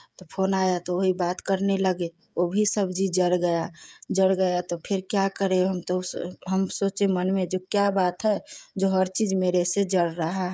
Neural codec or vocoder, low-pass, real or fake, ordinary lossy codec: codec, 16 kHz, 16 kbps, FreqCodec, smaller model; none; fake; none